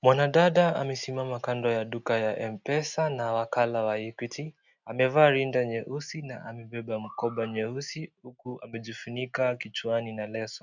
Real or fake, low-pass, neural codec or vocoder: real; 7.2 kHz; none